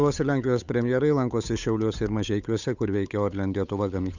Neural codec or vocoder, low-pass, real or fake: codec, 16 kHz, 8 kbps, FunCodec, trained on Chinese and English, 25 frames a second; 7.2 kHz; fake